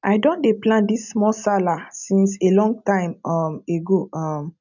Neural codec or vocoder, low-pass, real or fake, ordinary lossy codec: none; 7.2 kHz; real; none